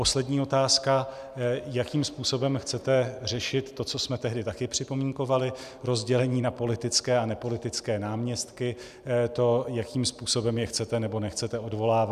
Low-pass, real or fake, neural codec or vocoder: 14.4 kHz; real; none